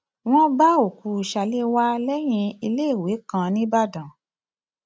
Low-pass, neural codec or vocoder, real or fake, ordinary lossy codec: none; none; real; none